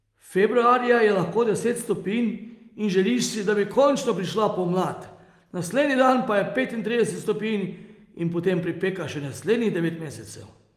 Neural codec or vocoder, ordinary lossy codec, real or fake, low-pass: none; Opus, 32 kbps; real; 14.4 kHz